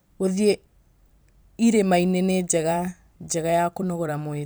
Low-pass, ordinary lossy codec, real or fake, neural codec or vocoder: none; none; fake; vocoder, 44.1 kHz, 128 mel bands every 256 samples, BigVGAN v2